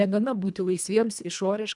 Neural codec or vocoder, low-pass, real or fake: codec, 24 kHz, 1.5 kbps, HILCodec; 10.8 kHz; fake